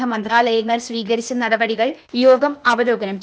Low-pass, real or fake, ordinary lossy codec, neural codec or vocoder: none; fake; none; codec, 16 kHz, 0.8 kbps, ZipCodec